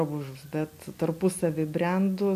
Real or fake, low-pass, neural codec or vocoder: real; 14.4 kHz; none